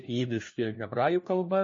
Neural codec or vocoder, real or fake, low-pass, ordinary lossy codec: codec, 16 kHz, 1 kbps, FunCodec, trained on LibriTTS, 50 frames a second; fake; 7.2 kHz; MP3, 32 kbps